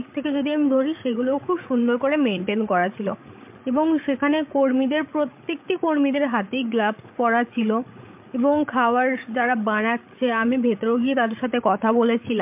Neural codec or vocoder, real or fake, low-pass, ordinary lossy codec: codec, 16 kHz, 8 kbps, FreqCodec, larger model; fake; 3.6 kHz; MP3, 32 kbps